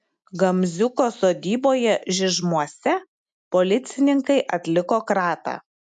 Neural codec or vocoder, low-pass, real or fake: none; 10.8 kHz; real